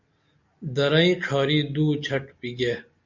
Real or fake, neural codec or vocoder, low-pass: real; none; 7.2 kHz